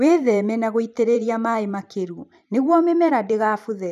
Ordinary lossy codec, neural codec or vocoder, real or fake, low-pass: none; vocoder, 44.1 kHz, 128 mel bands every 512 samples, BigVGAN v2; fake; 14.4 kHz